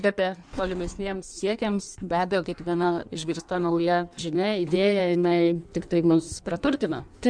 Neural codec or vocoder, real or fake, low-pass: codec, 16 kHz in and 24 kHz out, 1.1 kbps, FireRedTTS-2 codec; fake; 9.9 kHz